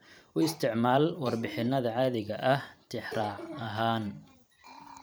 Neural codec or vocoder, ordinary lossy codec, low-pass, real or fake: vocoder, 44.1 kHz, 128 mel bands every 512 samples, BigVGAN v2; none; none; fake